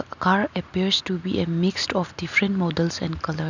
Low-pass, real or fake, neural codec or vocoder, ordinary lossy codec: 7.2 kHz; real; none; none